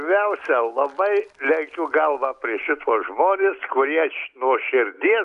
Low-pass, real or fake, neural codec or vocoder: 10.8 kHz; real; none